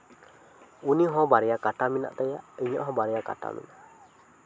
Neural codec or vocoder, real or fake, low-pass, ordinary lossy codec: none; real; none; none